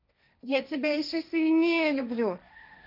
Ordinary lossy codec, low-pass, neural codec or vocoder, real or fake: none; 5.4 kHz; codec, 16 kHz, 1.1 kbps, Voila-Tokenizer; fake